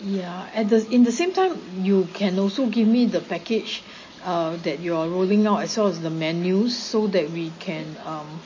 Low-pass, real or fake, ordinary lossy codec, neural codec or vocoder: 7.2 kHz; real; MP3, 32 kbps; none